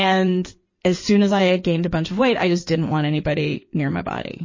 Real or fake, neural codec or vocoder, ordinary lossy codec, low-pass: fake; codec, 16 kHz in and 24 kHz out, 2.2 kbps, FireRedTTS-2 codec; MP3, 32 kbps; 7.2 kHz